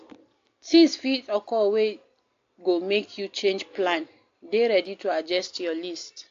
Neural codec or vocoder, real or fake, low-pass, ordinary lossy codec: none; real; 7.2 kHz; AAC, 48 kbps